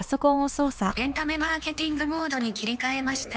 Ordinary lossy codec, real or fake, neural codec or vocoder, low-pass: none; fake; codec, 16 kHz, 4 kbps, X-Codec, HuBERT features, trained on LibriSpeech; none